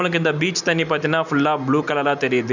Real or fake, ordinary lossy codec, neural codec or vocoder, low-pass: real; none; none; 7.2 kHz